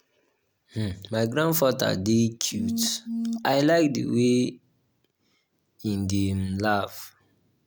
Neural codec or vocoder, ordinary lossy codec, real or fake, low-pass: none; none; real; none